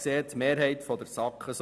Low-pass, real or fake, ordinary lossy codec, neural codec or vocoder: none; real; none; none